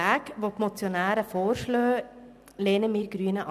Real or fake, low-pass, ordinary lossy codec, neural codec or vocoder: real; 14.4 kHz; none; none